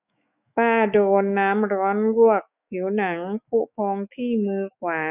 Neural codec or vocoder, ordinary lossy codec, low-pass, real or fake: autoencoder, 48 kHz, 128 numbers a frame, DAC-VAE, trained on Japanese speech; none; 3.6 kHz; fake